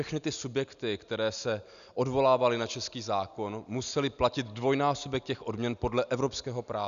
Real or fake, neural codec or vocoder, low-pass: real; none; 7.2 kHz